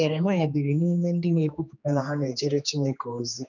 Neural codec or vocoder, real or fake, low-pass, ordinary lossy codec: codec, 16 kHz, 2 kbps, X-Codec, HuBERT features, trained on general audio; fake; 7.2 kHz; none